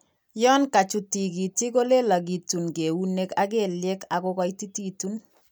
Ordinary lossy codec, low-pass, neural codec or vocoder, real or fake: none; none; none; real